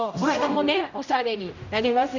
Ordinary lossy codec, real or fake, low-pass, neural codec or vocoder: none; fake; 7.2 kHz; codec, 16 kHz, 0.5 kbps, X-Codec, HuBERT features, trained on general audio